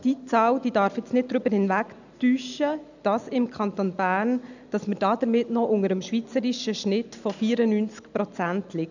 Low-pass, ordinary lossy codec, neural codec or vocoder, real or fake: 7.2 kHz; none; none; real